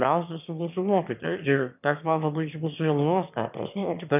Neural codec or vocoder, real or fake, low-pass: autoencoder, 22.05 kHz, a latent of 192 numbers a frame, VITS, trained on one speaker; fake; 3.6 kHz